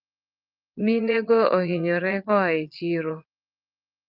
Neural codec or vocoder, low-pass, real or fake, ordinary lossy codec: vocoder, 22.05 kHz, 80 mel bands, Vocos; 5.4 kHz; fake; Opus, 24 kbps